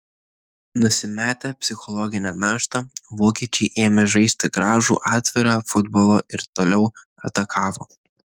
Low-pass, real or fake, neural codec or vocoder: 19.8 kHz; fake; codec, 44.1 kHz, 7.8 kbps, DAC